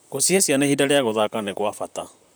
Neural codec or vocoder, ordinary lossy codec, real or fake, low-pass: vocoder, 44.1 kHz, 128 mel bands, Pupu-Vocoder; none; fake; none